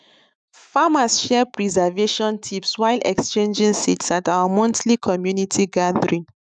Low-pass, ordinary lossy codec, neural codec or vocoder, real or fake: 9.9 kHz; none; autoencoder, 48 kHz, 128 numbers a frame, DAC-VAE, trained on Japanese speech; fake